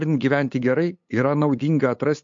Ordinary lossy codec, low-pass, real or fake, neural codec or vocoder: MP3, 48 kbps; 7.2 kHz; fake; codec, 16 kHz, 8 kbps, FunCodec, trained on LibriTTS, 25 frames a second